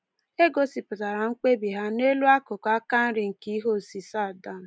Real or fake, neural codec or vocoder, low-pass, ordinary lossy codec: real; none; none; none